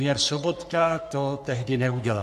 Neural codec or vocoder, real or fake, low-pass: codec, 44.1 kHz, 3.4 kbps, Pupu-Codec; fake; 14.4 kHz